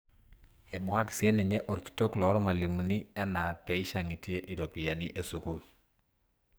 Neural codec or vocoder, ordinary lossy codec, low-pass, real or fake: codec, 44.1 kHz, 2.6 kbps, SNAC; none; none; fake